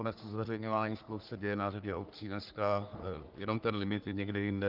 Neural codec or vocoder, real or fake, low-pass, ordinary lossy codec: codec, 44.1 kHz, 3.4 kbps, Pupu-Codec; fake; 5.4 kHz; Opus, 24 kbps